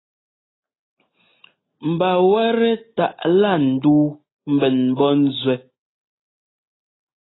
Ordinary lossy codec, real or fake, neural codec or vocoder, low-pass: AAC, 16 kbps; real; none; 7.2 kHz